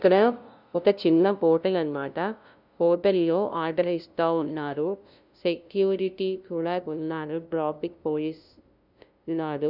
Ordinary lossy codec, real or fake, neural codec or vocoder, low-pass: none; fake; codec, 16 kHz, 0.5 kbps, FunCodec, trained on LibriTTS, 25 frames a second; 5.4 kHz